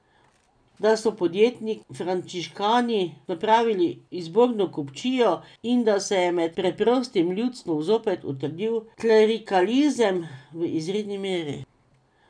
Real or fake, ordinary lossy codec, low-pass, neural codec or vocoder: real; none; 9.9 kHz; none